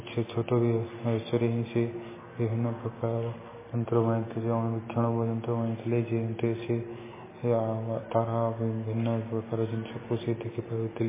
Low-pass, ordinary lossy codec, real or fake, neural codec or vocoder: 3.6 kHz; MP3, 16 kbps; real; none